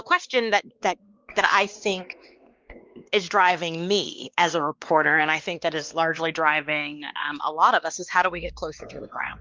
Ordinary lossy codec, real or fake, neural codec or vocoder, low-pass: Opus, 32 kbps; fake; codec, 16 kHz, 2 kbps, X-Codec, WavLM features, trained on Multilingual LibriSpeech; 7.2 kHz